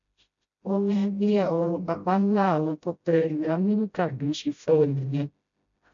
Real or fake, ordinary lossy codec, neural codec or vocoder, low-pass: fake; AAC, 64 kbps; codec, 16 kHz, 0.5 kbps, FreqCodec, smaller model; 7.2 kHz